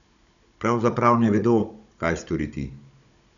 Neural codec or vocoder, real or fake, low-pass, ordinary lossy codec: codec, 16 kHz, 16 kbps, FunCodec, trained on Chinese and English, 50 frames a second; fake; 7.2 kHz; none